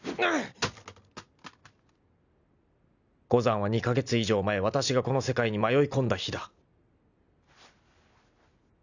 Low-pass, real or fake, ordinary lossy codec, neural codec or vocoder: 7.2 kHz; real; none; none